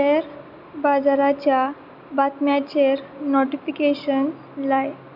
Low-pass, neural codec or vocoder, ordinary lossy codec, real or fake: 5.4 kHz; none; none; real